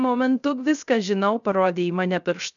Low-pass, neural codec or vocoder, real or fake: 7.2 kHz; codec, 16 kHz, 0.3 kbps, FocalCodec; fake